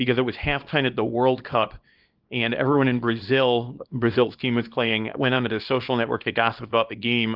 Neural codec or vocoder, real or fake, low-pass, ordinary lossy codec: codec, 24 kHz, 0.9 kbps, WavTokenizer, small release; fake; 5.4 kHz; Opus, 32 kbps